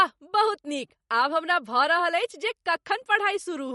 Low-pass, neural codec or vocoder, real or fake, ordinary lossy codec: 19.8 kHz; vocoder, 44.1 kHz, 128 mel bands every 256 samples, BigVGAN v2; fake; MP3, 48 kbps